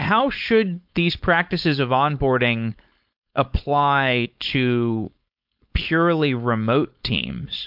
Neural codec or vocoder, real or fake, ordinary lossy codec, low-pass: codec, 16 kHz, 4.8 kbps, FACodec; fake; MP3, 48 kbps; 5.4 kHz